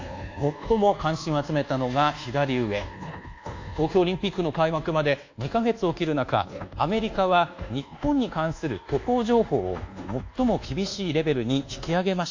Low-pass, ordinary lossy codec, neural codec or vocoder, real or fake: 7.2 kHz; AAC, 48 kbps; codec, 24 kHz, 1.2 kbps, DualCodec; fake